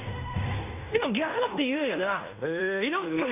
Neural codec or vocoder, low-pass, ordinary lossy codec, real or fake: codec, 16 kHz in and 24 kHz out, 0.9 kbps, LongCat-Audio-Codec, fine tuned four codebook decoder; 3.6 kHz; none; fake